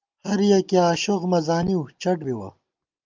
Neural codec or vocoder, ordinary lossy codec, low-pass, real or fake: none; Opus, 24 kbps; 7.2 kHz; real